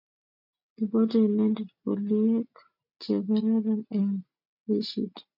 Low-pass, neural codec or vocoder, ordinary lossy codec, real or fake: 5.4 kHz; none; MP3, 48 kbps; real